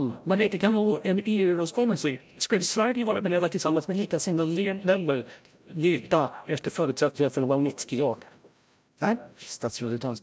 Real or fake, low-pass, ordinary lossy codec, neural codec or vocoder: fake; none; none; codec, 16 kHz, 0.5 kbps, FreqCodec, larger model